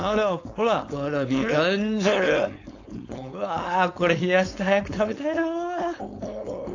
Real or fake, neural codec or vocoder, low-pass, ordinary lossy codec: fake; codec, 16 kHz, 4.8 kbps, FACodec; 7.2 kHz; AAC, 48 kbps